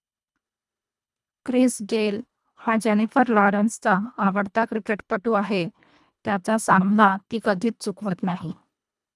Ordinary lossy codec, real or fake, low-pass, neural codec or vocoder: none; fake; none; codec, 24 kHz, 1.5 kbps, HILCodec